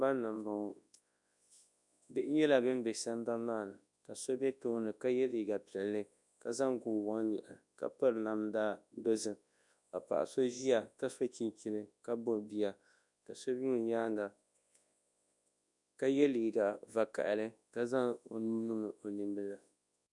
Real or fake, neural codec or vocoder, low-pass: fake; codec, 24 kHz, 0.9 kbps, WavTokenizer, large speech release; 10.8 kHz